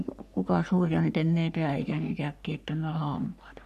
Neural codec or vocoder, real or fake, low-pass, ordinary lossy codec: codec, 44.1 kHz, 3.4 kbps, Pupu-Codec; fake; 14.4 kHz; none